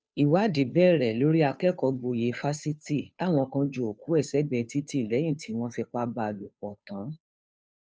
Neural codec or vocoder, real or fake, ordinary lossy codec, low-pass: codec, 16 kHz, 2 kbps, FunCodec, trained on Chinese and English, 25 frames a second; fake; none; none